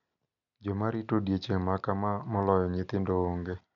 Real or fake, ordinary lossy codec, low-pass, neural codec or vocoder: real; none; 7.2 kHz; none